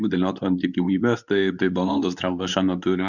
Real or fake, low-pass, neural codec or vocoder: fake; 7.2 kHz; codec, 24 kHz, 0.9 kbps, WavTokenizer, medium speech release version 2